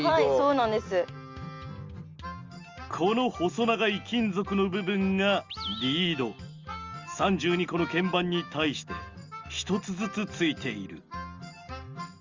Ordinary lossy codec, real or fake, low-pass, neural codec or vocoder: Opus, 32 kbps; real; 7.2 kHz; none